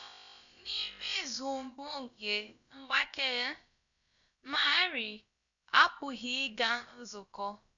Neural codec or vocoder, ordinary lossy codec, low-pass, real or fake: codec, 16 kHz, about 1 kbps, DyCAST, with the encoder's durations; none; 7.2 kHz; fake